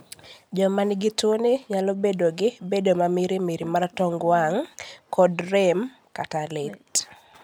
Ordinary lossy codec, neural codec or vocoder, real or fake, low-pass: none; none; real; none